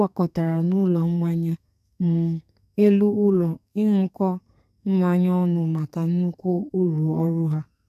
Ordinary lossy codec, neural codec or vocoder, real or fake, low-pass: none; codec, 32 kHz, 1.9 kbps, SNAC; fake; 14.4 kHz